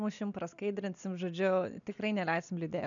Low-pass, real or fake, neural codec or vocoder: 7.2 kHz; real; none